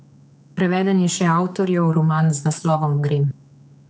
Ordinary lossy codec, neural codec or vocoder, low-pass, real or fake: none; codec, 16 kHz, 4 kbps, X-Codec, HuBERT features, trained on general audio; none; fake